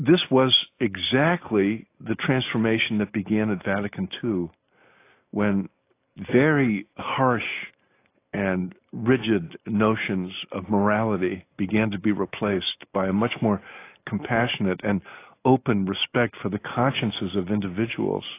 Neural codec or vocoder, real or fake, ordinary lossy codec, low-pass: none; real; AAC, 24 kbps; 3.6 kHz